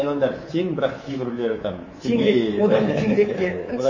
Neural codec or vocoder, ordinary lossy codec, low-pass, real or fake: codec, 24 kHz, 3.1 kbps, DualCodec; MP3, 32 kbps; 7.2 kHz; fake